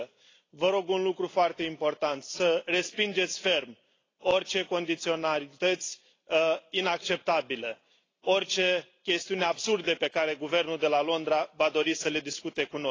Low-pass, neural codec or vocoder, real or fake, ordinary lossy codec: 7.2 kHz; none; real; AAC, 32 kbps